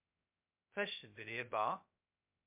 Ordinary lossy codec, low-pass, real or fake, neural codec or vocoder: MP3, 32 kbps; 3.6 kHz; fake; codec, 16 kHz, 0.2 kbps, FocalCodec